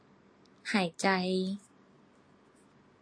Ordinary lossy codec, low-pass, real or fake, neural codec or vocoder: AAC, 48 kbps; 9.9 kHz; real; none